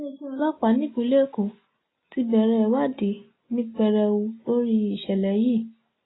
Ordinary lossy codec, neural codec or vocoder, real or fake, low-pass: AAC, 16 kbps; none; real; 7.2 kHz